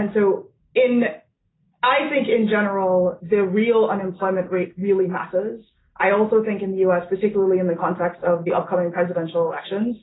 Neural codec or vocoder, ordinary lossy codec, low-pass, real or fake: none; AAC, 16 kbps; 7.2 kHz; real